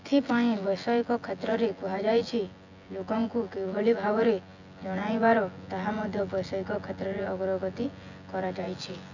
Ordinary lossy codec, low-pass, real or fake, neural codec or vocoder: none; 7.2 kHz; fake; vocoder, 24 kHz, 100 mel bands, Vocos